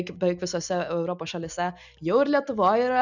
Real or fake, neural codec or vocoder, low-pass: real; none; 7.2 kHz